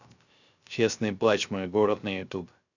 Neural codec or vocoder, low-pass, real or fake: codec, 16 kHz, 0.3 kbps, FocalCodec; 7.2 kHz; fake